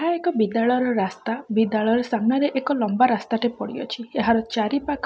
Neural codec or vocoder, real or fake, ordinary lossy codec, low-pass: none; real; none; none